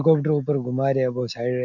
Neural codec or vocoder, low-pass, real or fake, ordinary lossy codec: none; 7.2 kHz; real; none